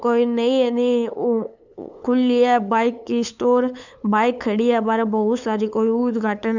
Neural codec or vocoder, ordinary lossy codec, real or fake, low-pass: codec, 16 kHz, 8 kbps, FunCodec, trained on LibriTTS, 25 frames a second; none; fake; 7.2 kHz